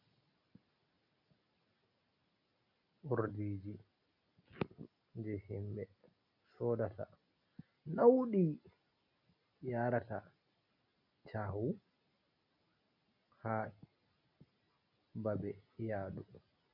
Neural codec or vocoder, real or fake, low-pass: none; real; 5.4 kHz